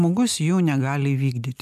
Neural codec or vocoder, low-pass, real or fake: none; 14.4 kHz; real